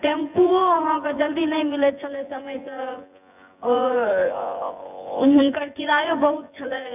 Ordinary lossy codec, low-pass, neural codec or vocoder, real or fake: none; 3.6 kHz; vocoder, 24 kHz, 100 mel bands, Vocos; fake